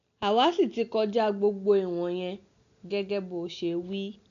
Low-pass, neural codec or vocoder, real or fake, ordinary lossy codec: 7.2 kHz; none; real; MP3, 64 kbps